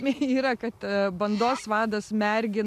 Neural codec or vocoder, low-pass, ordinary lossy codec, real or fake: none; 14.4 kHz; MP3, 96 kbps; real